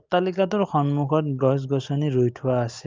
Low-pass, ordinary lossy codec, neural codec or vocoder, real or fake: 7.2 kHz; Opus, 24 kbps; none; real